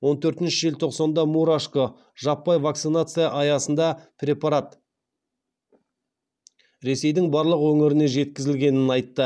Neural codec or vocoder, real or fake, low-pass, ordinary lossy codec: none; real; none; none